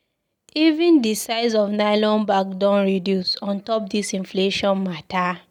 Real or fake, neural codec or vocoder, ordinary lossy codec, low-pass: real; none; none; 19.8 kHz